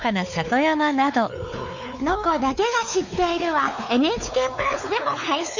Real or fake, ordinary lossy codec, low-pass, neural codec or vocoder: fake; none; 7.2 kHz; codec, 16 kHz, 4 kbps, X-Codec, WavLM features, trained on Multilingual LibriSpeech